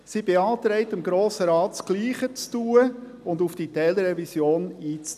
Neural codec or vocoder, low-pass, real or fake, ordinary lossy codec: none; 14.4 kHz; real; none